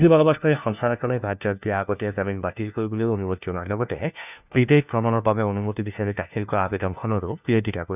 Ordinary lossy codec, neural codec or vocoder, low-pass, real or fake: none; codec, 16 kHz, 1 kbps, FunCodec, trained on LibriTTS, 50 frames a second; 3.6 kHz; fake